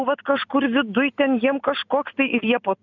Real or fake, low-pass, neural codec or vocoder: real; 7.2 kHz; none